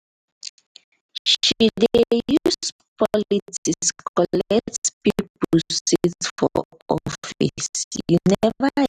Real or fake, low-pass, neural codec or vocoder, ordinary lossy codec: real; 14.4 kHz; none; none